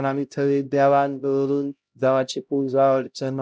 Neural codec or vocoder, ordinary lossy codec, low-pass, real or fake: codec, 16 kHz, 0.5 kbps, X-Codec, HuBERT features, trained on LibriSpeech; none; none; fake